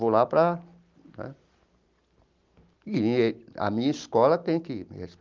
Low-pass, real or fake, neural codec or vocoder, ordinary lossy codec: 7.2 kHz; real; none; Opus, 24 kbps